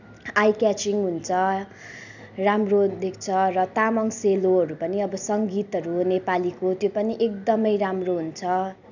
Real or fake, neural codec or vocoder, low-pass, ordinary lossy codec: real; none; 7.2 kHz; none